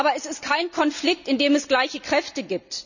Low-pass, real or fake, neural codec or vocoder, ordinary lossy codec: 7.2 kHz; real; none; none